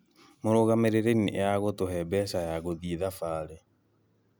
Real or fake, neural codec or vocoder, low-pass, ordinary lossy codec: real; none; none; none